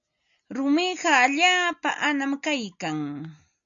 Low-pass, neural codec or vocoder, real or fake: 7.2 kHz; none; real